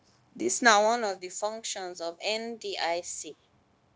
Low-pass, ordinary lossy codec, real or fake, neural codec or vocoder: none; none; fake; codec, 16 kHz, 0.9 kbps, LongCat-Audio-Codec